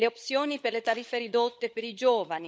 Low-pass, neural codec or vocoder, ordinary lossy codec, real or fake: none; codec, 16 kHz, 16 kbps, FunCodec, trained on LibriTTS, 50 frames a second; none; fake